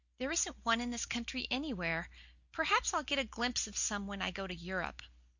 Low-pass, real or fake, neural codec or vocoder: 7.2 kHz; real; none